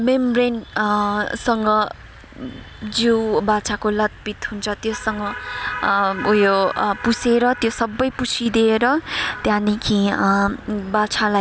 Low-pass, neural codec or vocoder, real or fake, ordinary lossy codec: none; none; real; none